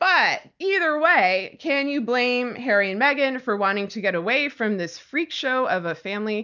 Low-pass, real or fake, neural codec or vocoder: 7.2 kHz; real; none